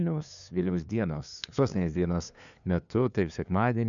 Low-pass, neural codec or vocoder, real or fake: 7.2 kHz; codec, 16 kHz, 2 kbps, FunCodec, trained on LibriTTS, 25 frames a second; fake